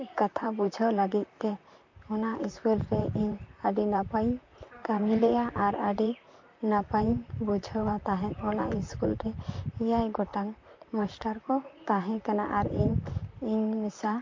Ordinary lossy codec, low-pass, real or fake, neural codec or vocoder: MP3, 48 kbps; 7.2 kHz; fake; vocoder, 44.1 kHz, 128 mel bands, Pupu-Vocoder